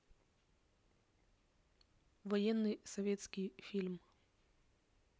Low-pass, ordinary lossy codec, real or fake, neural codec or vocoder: none; none; real; none